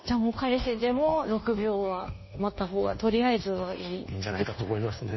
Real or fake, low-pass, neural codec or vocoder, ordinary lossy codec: fake; 7.2 kHz; codec, 24 kHz, 1.2 kbps, DualCodec; MP3, 24 kbps